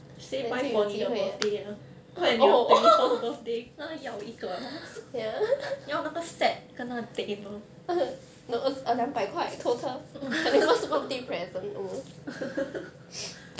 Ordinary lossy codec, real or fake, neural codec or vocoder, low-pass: none; real; none; none